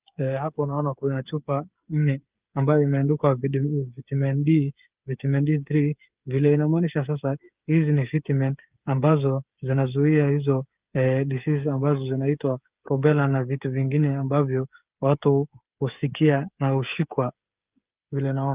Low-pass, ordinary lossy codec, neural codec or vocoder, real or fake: 3.6 kHz; Opus, 32 kbps; codec, 16 kHz, 8 kbps, FreqCodec, smaller model; fake